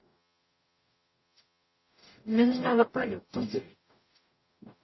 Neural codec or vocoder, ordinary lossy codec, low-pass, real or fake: codec, 44.1 kHz, 0.9 kbps, DAC; MP3, 24 kbps; 7.2 kHz; fake